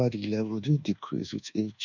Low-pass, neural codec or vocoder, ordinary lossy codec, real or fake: 7.2 kHz; codec, 24 kHz, 1.2 kbps, DualCodec; none; fake